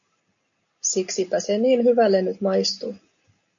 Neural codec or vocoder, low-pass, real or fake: none; 7.2 kHz; real